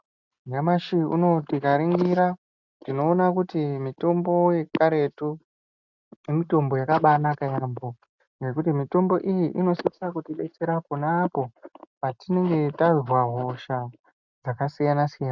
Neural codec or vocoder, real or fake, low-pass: none; real; 7.2 kHz